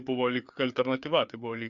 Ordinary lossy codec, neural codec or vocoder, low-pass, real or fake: Opus, 64 kbps; codec, 16 kHz, 4 kbps, FreqCodec, larger model; 7.2 kHz; fake